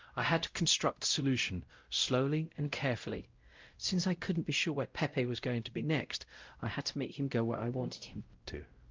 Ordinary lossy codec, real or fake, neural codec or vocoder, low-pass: Opus, 32 kbps; fake; codec, 16 kHz, 0.5 kbps, X-Codec, WavLM features, trained on Multilingual LibriSpeech; 7.2 kHz